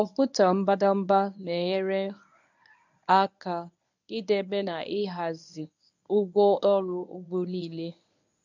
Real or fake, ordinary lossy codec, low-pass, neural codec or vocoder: fake; none; 7.2 kHz; codec, 24 kHz, 0.9 kbps, WavTokenizer, medium speech release version 1